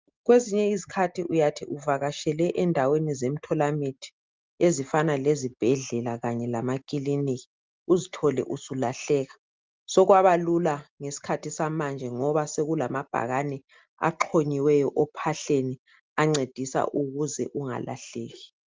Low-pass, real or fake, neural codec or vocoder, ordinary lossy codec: 7.2 kHz; real; none; Opus, 24 kbps